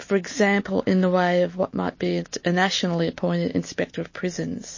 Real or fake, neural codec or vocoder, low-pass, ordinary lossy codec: real; none; 7.2 kHz; MP3, 32 kbps